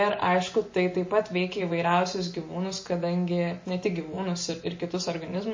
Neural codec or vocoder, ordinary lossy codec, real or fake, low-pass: none; MP3, 32 kbps; real; 7.2 kHz